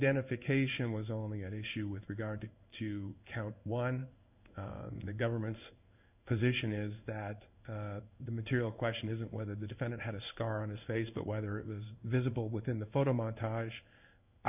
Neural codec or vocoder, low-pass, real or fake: codec, 16 kHz in and 24 kHz out, 1 kbps, XY-Tokenizer; 3.6 kHz; fake